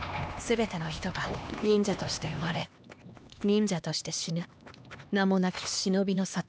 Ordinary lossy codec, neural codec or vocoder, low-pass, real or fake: none; codec, 16 kHz, 2 kbps, X-Codec, HuBERT features, trained on LibriSpeech; none; fake